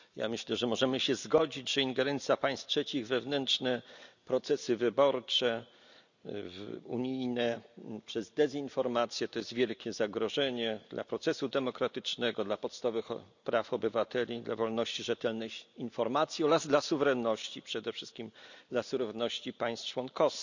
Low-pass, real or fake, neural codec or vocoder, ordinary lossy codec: 7.2 kHz; real; none; none